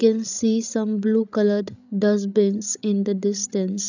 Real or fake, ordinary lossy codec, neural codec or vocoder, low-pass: fake; none; codec, 16 kHz, 16 kbps, FreqCodec, larger model; 7.2 kHz